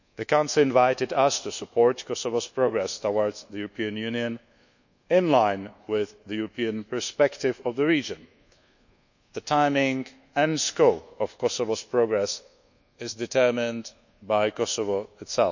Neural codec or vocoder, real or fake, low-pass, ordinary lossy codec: codec, 24 kHz, 1.2 kbps, DualCodec; fake; 7.2 kHz; none